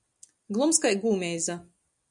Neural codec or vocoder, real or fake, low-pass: none; real; 10.8 kHz